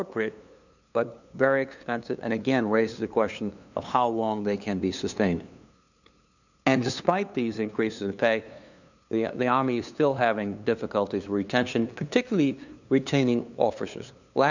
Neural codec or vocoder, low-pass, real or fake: codec, 16 kHz, 2 kbps, FunCodec, trained on LibriTTS, 25 frames a second; 7.2 kHz; fake